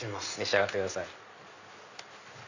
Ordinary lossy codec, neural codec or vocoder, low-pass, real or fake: none; none; 7.2 kHz; real